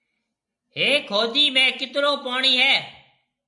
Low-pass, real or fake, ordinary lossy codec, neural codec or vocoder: 10.8 kHz; real; MP3, 96 kbps; none